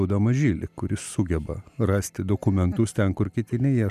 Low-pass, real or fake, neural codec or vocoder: 14.4 kHz; real; none